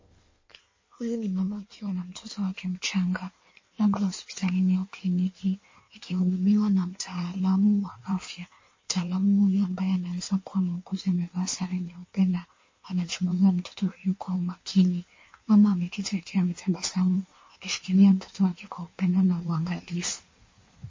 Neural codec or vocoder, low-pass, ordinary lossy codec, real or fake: codec, 16 kHz in and 24 kHz out, 1.1 kbps, FireRedTTS-2 codec; 7.2 kHz; MP3, 32 kbps; fake